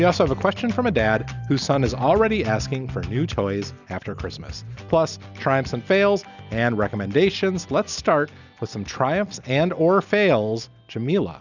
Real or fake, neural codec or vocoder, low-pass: real; none; 7.2 kHz